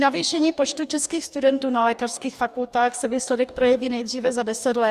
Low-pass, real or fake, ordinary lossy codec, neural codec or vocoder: 14.4 kHz; fake; Opus, 64 kbps; codec, 44.1 kHz, 2.6 kbps, DAC